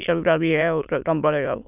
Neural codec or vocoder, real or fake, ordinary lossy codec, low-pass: autoencoder, 22.05 kHz, a latent of 192 numbers a frame, VITS, trained on many speakers; fake; none; 3.6 kHz